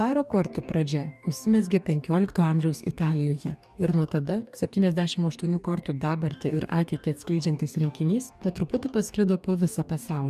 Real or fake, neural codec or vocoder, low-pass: fake; codec, 44.1 kHz, 2.6 kbps, DAC; 14.4 kHz